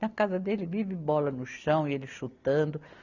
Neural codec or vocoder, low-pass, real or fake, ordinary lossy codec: none; 7.2 kHz; real; none